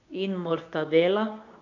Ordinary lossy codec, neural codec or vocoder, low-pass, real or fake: none; codec, 24 kHz, 0.9 kbps, WavTokenizer, medium speech release version 2; 7.2 kHz; fake